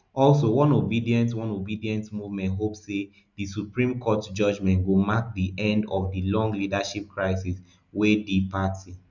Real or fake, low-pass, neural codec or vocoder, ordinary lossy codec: real; 7.2 kHz; none; none